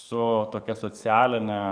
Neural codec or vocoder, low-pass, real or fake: codec, 44.1 kHz, 7.8 kbps, DAC; 9.9 kHz; fake